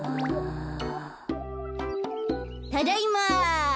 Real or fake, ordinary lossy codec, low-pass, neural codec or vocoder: real; none; none; none